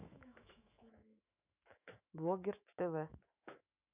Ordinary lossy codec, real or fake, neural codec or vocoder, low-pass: none; fake; autoencoder, 48 kHz, 128 numbers a frame, DAC-VAE, trained on Japanese speech; 3.6 kHz